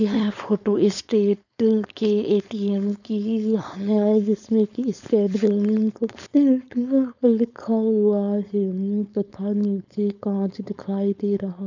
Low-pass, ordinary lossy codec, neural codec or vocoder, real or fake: 7.2 kHz; none; codec, 16 kHz, 4.8 kbps, FACodec; fake